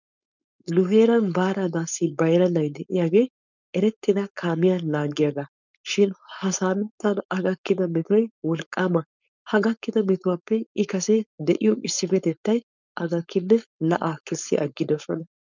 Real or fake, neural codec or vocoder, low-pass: fake; codec, 16 kHz, 4.8 kbps, FACodec; 7.2 kHz